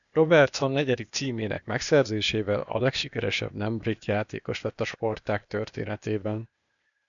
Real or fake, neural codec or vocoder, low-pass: fake; codec, 16 kHz, 0.8 kbps, ZipCodec; 7.2 kHz